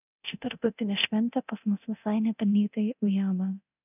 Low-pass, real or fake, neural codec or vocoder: 3.6 kHz; fake; codec, 24 kHz, 0.9 kbps, DualCodec